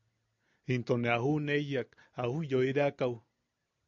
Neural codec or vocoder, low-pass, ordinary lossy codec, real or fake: none; 7.2 kHz; AAC, 64 kbps; real